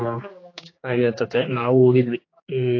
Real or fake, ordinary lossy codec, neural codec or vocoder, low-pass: fake; AAC, 32 kbps; codec, 16 kHz, 4 kbps, X-Codec, HuBERT features, trained on general audio; 7.2 kHz